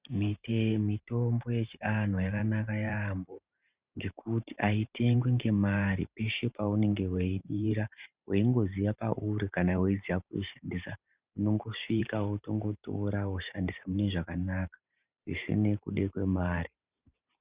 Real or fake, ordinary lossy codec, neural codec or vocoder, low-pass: fake; Opus, 64 kbps; vocoder, 44.1 kHz, 128 mel bands every 512 samples, BigVGAN v2; 3.6 kHz